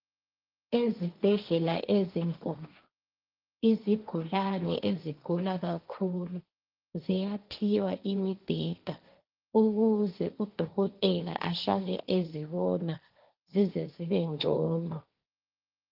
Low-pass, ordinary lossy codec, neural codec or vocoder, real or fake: 5.4 kHz; Opus, 24 kbps; codec, 16 kHz, 1.1 kbps, Voila-Tokenizer; fake